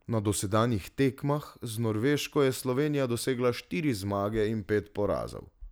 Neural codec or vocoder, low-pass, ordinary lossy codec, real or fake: none; none; none; real